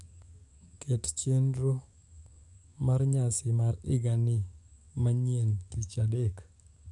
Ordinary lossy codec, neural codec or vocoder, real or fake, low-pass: none; codec, 44.1 kHz, 7.8 kbps, DAC; fake; 10.8 kHz